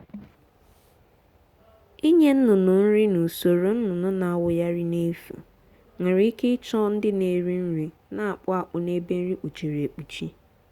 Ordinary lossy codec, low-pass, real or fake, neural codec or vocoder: Opus, 64 kbps; 19.8 kHz; real; none